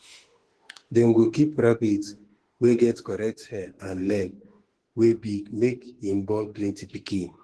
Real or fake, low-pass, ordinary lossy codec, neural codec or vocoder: fake; 10.8 kHz; Opus, 16 kbps; autoencoder, 48 kHz, 32 numbers a frame, DAC-VAE, trained on Japanese speech